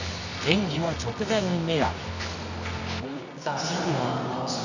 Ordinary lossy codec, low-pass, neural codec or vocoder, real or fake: none; 7.2 kHz; codec, 24 kHz, 0.9 kbps, WavTokenizer, medium music audio release; fake